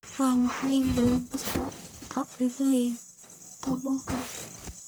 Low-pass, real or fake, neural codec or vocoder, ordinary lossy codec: none; fake; codec, 44.1 kHz, 1.7 kbps, Pupu-Codec; none